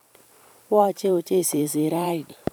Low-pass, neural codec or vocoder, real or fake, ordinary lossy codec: none; vocoder, 44.1 kHz, 128 mel bands every 512 samples, BigVGAN v2; fake; none